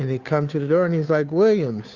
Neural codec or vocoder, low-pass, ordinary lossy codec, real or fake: codec, 16 kHz, 2 kbps, FunCodec, trained on Chinese and English, 25 frames a second; 7.2 kHz; Opus, 64 kbps; fake